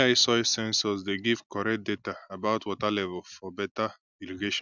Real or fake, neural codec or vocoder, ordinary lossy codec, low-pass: real; none; none; 7.2 kHz